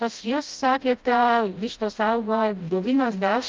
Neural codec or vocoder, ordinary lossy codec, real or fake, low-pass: codec, 16 kHz, 0.5 kbps, FreqCodec, smaller model; Opus, 24 kbps; fake; 7.2 kHz